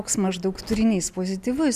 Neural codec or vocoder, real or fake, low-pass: none; real; 14.4 kHz